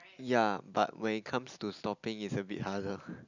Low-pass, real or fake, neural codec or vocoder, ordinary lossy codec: 7.2 kHz; real; none; none